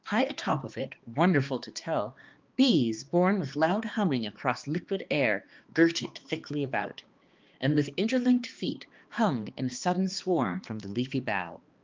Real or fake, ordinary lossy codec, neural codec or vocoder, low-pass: fake; Opus, 32 kbps; codec, 16 kHz, 2 kbps, X-Codec, HuBERT features, trained on balanced general audio; 7.2 kHz